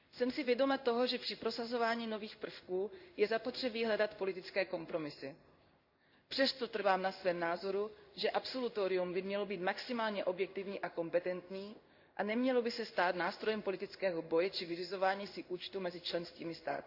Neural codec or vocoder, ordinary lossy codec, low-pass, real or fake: codec, 16 kHz in and 24 kHz out, 1 kbps, XY-Tokenizer; Opus, 64 kbps; 5.4 kHz; fake